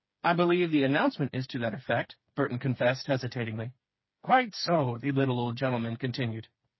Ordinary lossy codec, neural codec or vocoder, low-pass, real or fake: MP3, 24 kbps; codec, 16 kHz, 4 kbps, FreqCodec, smaller model; 7.2 kHz; fake